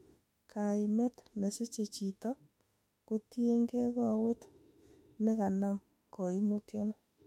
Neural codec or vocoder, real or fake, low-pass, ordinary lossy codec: autoencoder, 48 kHz, 32 numbers a frame, DAC-VAE, trained on Japanese speech; fake; 19.8 kHz; MP3, 64 kbps